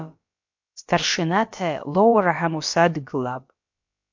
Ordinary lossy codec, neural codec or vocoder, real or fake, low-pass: MP3, 48 kbps; codec, 16 kHz, about 1 kbps, DyCAST, with the encoder's durations; fake; 7.2 kHz